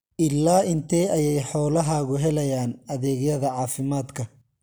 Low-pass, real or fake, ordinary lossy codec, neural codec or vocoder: none; real; none; none